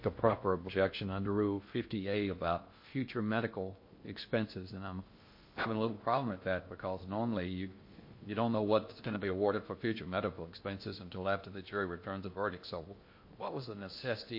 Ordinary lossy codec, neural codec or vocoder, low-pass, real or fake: MP3, 32 kbps; codec, 16 kHz in and 24 kHz out, 0.6 kbps, FocalCodec, streaming, 2048 codes; 5.4 kHz; fake